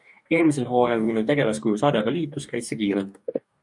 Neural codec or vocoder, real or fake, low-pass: codec, 44.1 kHz, 2.6 kbps, DAC; fake; 10.8 kHz